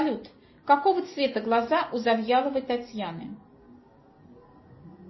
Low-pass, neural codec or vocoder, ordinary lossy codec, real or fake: 7.2 kHz; none; MP3, 24 kbps; real